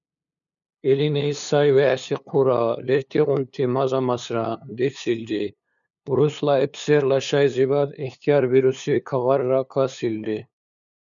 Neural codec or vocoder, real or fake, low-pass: codec, 16 kHz, 2 kbps, FunCodec, trained on LibriTTS, 25 frames a second; fake; 7.2 kHz